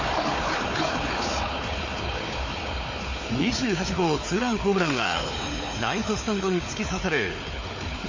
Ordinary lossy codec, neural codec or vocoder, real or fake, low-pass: MP3, 32 kbps; codec, 16 kHz, 4 kbps, FunCodec, trained on Chinese and English, 50 frames a second; fake; 7.2 kHz